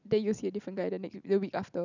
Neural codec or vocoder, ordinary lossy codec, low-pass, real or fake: none; none; 7.2 kHz; real